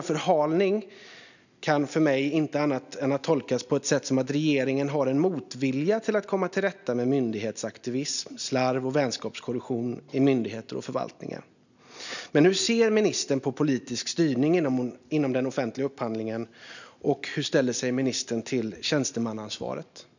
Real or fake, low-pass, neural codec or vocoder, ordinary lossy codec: real; 7.2 kHz; none; none